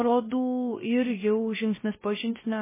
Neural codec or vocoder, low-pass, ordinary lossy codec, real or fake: codec, 16 kHz, 0.3 kbps, FocalCodec; 3.6 kHz; MP3, 16 kbps; fake